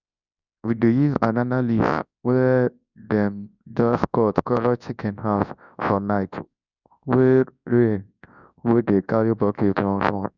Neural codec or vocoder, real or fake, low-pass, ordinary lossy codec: codec, 24 kHz, 0.9 kbps, WavTokenizer, large speech release; fake; 7.2 kHz; none